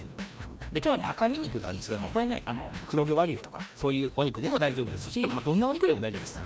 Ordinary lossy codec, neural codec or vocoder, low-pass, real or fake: none; codec, 16 kHz, 1 kbps, FreqCodec, larger model; none; fake